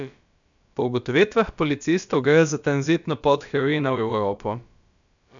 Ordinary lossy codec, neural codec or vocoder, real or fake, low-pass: none; codec, 16 kHz, about 1 kbps, DyCAST, with the encoder's durations; fake; 7.2 kHz